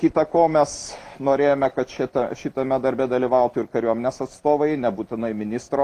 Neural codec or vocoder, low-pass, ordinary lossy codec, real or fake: none; 14.4 kHz; Opus, 24 kbps; real